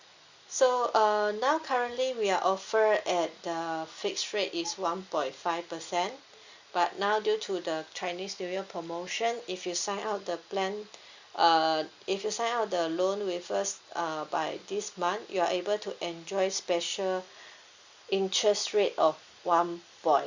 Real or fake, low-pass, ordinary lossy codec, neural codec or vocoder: real; 7.2 kHz; Opus, 64 kbps; none